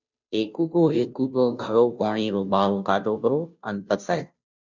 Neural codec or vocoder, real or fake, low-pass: codec, 16 kHz, 0.5 kbps, FunCodec, trained on Chinese and English, 25 frames a second; fake; 7.2 kHz